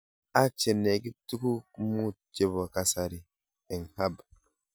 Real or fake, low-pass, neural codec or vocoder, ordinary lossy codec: real; none; none; none